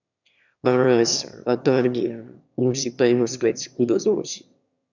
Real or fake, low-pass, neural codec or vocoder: fake; 7.2 kHz; autoencoder, 22.05 kHz, a latent of 192 numbers a frame, VITS, trained on one speaker